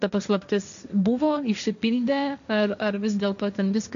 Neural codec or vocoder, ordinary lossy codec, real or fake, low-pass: codec, 16 kHz, 1.1 kbps, Voila-Tokenizer; AAC, 96 kbps; fake; 7.2 kHz